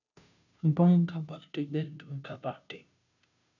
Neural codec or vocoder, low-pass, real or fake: codec, 16 kHz, 0.5 kbps, FunCodec, trained on Chinese and English, 25 frames a second; 7.2 kHz; fake